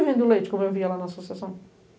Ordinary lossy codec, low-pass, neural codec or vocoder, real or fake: none; none; none; real